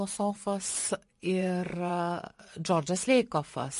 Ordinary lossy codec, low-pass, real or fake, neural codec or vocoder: MP3, 48 kbps; 10.8 kHz; fake; vocoder, 24 kHz, 100 mel bands, Vocos